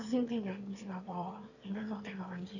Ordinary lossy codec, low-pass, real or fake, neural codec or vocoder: none; 7.2 kHz; fake; autoencoder, 22.05 kHz, a latent of 192 numbers a frame, VITS, trained on one speaker